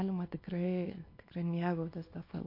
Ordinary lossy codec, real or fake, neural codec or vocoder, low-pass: MP3, 32 kbps; fake; codec, 24 kHz, 0.9 kbps, WavTokenizer, small release; 5.4 kHz